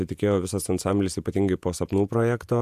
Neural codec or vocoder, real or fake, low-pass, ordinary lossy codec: vocoder, 48 kHz, 128 mel bands, Vocos; fake; 14.4 kHz; AAC, 96 kbps